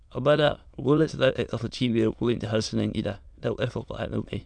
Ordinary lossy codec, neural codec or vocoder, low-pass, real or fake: none; autoencoder, 22.05 kHz, a latent of 192 numbers a frame, VITS, trained on many speakers; 9.9 kHz; fake